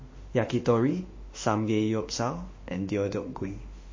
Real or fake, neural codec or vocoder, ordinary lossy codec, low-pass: fake; autoencoder, 48 kHz, 32 numbers a frame, DAC-VAE, trained on Japanese speech; MP3, 32 kbps; 7.2 kHz